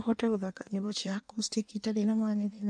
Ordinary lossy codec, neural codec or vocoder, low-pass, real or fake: MP3, 64 kbps; codec, 16 kHz in and 24 kHz out, 1.1 kbps, FireRedTTS-2 codec; 9.9 kHz; fake